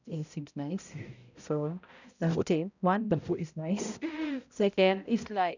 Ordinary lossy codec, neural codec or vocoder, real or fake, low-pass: none; codec, 16 kHz, 0.5 kbps, X-Codec, HuBERT features, trained on balanced general audio; fake; 7.2 kHz